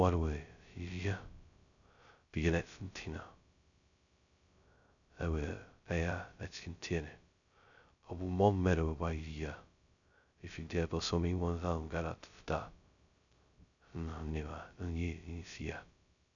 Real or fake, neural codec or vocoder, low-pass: fake; codec, 16 kHz, 0.2 kbps, FocalCodec; 7.2 kHz